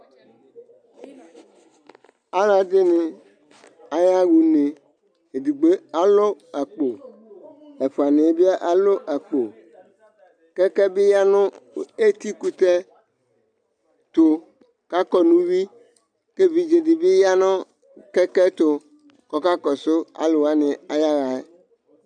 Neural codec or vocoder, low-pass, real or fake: none; 9.9 kHz; real